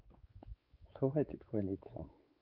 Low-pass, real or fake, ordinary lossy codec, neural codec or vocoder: 5.4 kHz; fake; Opus, 24 kbps; codec, 16 kHz, 4 kbps, X-Codec, WavLM features, trained on Multilingual LibriSpeech